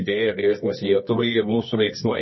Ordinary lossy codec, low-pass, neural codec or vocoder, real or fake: MP3, 24 kbps; 7.2 kHz; codec, 24 kHz, 0.9 kbps, WavTokenizer, medium music audio release; fake